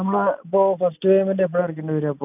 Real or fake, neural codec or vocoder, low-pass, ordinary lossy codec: real; none; 3.6 kHz; AAC, 32 kbps